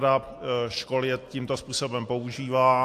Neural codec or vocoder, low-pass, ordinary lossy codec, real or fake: none; 14.4 kHz; AAC, 64 kbps; real